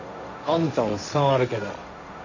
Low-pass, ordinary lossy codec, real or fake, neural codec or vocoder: 7.2 kHz; AAC, 48 kbps; fake; codec, 16 kHz, 1.1 kbps, Voila-Tokenizer